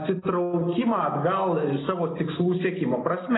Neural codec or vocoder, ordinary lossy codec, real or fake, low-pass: none; AAC, 16 kbps; real; 7.2 kHz